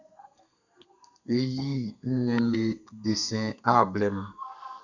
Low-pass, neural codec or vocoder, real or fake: 7.2 kHz; codec, 32 kHz, 1.9 kbps, SNAC; fake